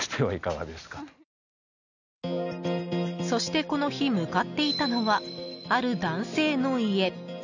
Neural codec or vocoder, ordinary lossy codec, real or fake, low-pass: none; none; real; 7.2 kHz